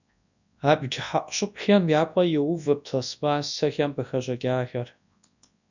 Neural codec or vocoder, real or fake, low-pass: codec, 24 kHz, 0.9 kbps, WavTokenizer, large speech release; fake; 7.2 kHz